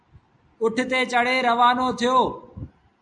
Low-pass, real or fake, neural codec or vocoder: 10.8 kHz; real; none